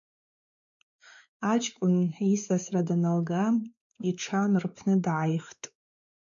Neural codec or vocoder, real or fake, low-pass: codec, 16 kHz, 4 kbps, X-Codec, WavLM features, trained on Multilingual LibriSpeech; fake; 7.2 kHz